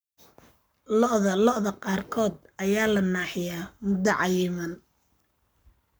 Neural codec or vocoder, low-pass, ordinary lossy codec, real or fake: codec, 44.1 kHz, 7.8 kbps, Pupu-Codec; none; none; fake